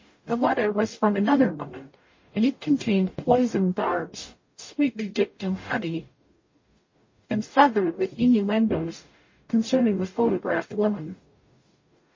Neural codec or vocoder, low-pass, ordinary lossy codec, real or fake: codec, 44.1 kHz, 0.9 kbps, DAC; 7.2 kHz; MP3, 32 kbps; fake